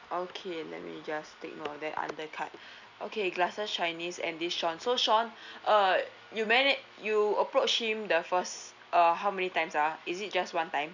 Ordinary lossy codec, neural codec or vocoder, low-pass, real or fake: none; none; 7.2 kHz; real